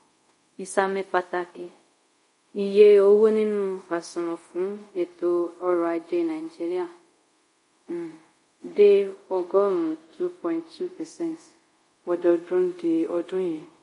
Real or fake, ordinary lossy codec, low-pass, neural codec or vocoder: fake; MP3, 48 kbps; 10.8 kHz; codec, 24 kHz, 0.5 kbps, DualCodec